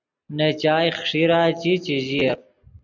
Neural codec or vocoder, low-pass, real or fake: none; 7.2 kHz; real